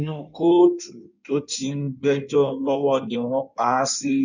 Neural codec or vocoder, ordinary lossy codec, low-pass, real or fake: codec, 16 kHz in and 24 kHz out, 1.1 kbps, FireRedTTS-2 codec; none; 7.2 kHz; fake